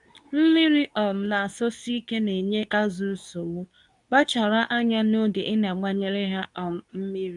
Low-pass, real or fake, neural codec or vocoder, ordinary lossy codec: 10.8 kHz; fake; codec, 24 kHz, 0.9 kbps, WavTokenizer, medium speech release version 2; none